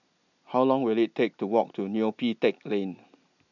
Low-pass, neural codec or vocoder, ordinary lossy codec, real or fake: 7.2 kHz; none; none; real